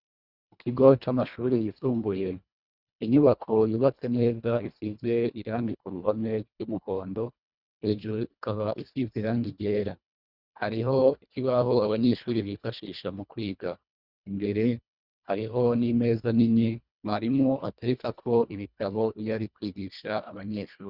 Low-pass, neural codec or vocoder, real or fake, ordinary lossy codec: 5.4 kHz; codec, 24 kHz, 1.5 kbps, HILCodec; fake; Opus, 64 kbps